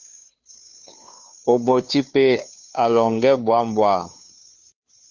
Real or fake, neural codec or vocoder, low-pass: fake; codec, 16 kHz, 2 kbps, FunCodec, trained on Chinese and English, 25 frames a second; 7.2 kHz